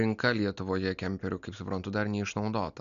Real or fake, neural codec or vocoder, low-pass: real; none; 7.2 kHz